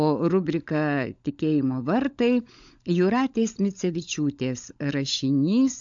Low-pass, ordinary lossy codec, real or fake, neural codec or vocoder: 7.2 kHz; AAC, 64 kbps; fake; codec, 16 kHz, 16 kbps, FunCodec, trained on LibriTTS, 50 frames a second